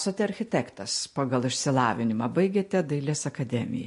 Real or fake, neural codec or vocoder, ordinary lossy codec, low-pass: real; none; MP3, 48 kbps; 10.8 kHz